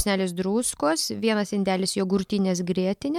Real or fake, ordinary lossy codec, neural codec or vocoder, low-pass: real; MP3, 96 kbps; none; 19.8 kHz